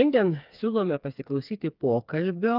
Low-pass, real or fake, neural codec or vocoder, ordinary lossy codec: 5.4 kHz; fake; codec, 16 kHz, 4 kbps, FreqCodec, smaller model; Opus, 24 kbps